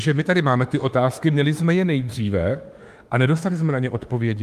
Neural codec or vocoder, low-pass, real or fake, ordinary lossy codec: autoencoder, 48 kHz, 32 numbers a frame, DAC-VAE, trained on Japanese speech; 14.4 kHz; fake; Opus, 32 kbps